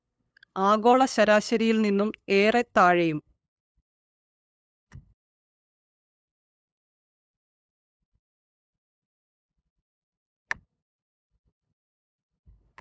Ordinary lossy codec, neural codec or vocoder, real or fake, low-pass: none; codec, 16 kHz, 8 kbps, FunCodec, trained on LibriTTS, 25 frames a second; fake; none